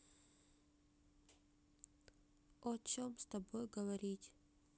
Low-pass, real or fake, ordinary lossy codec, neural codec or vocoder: none; real; none; none